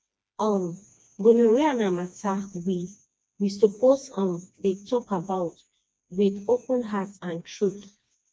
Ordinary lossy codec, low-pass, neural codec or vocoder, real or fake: none; none; codec, 16 kHz, 2 kbps, FreqCodec, smaller model; fake